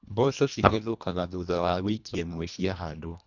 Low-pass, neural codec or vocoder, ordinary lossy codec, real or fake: 7.2 kHz; codec, 24 kHz, 1.5 kbps, HILCodec; none; fake